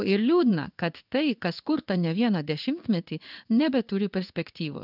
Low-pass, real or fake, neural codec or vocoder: 5.4 kHz; fake; codec, 16 kHz in and 24 kHz out, 1 kbps, XY-Tokenizer